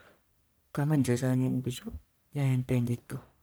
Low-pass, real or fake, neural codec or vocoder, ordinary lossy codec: none; fake; codec, 44.1 kHz, 1.7 kbps, Pupu-Codec; none